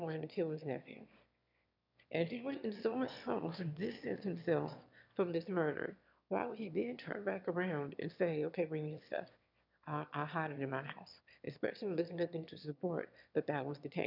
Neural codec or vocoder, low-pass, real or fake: autoencoder, 22.05 kHz, a latent of 192 numbers a frame, VITS, trained on one speaker; 5.4 kHz; fake